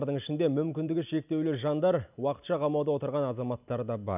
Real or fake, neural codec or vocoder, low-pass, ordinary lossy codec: real; none; 3.6 kHz; none